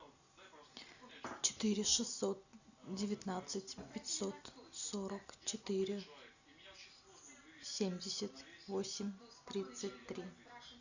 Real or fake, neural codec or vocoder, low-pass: real; none; 7.2 kHz